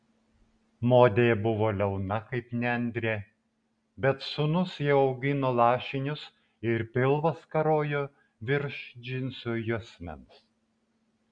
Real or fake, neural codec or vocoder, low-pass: real; none; 9.9 kHz